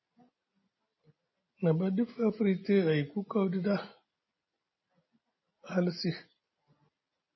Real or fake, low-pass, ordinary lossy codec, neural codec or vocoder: real; 7.2 kHz; MP3, 24 kbps; none